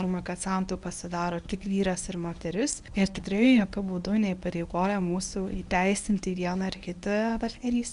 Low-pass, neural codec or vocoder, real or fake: 10.8 kHz; codec, 24 kHz, 0.9 kbps, WavTokenizer, medium speech release version 1; fake